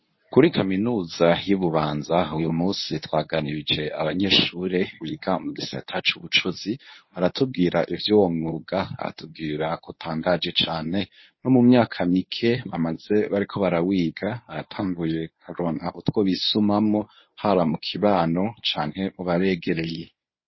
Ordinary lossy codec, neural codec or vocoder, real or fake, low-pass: MP3, 24 kbps; codec, 24 kHz, 0.9 kbps, WavTokenizer, medium speech release version 1; fake; 7.2 kHz